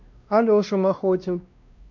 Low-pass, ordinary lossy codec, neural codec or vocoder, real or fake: 7.2 kHz; MP3, 64 kbps; codec, 16 kHz, 1 kbps, X-Codec, WavLM features, trained on Multilingual LibriSpeech; fake